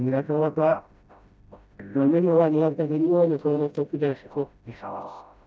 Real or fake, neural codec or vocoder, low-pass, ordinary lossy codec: fake; codec, 16 kHz, 0.5 kbps, FreqCodec, smaller model; none; none